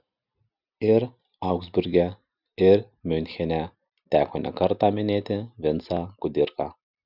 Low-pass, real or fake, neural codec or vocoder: 5.4 kHz; real; none